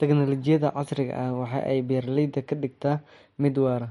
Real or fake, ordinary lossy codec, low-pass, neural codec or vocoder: real; MP3, 48 kbps; 19.8 kHz; none